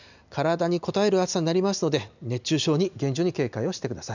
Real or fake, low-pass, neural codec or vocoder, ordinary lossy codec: real; 7.2 kHz; none; none